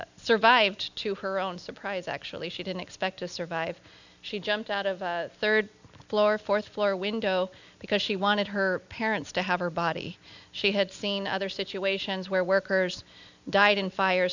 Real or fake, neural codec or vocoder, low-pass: real; none; 7.2 kHz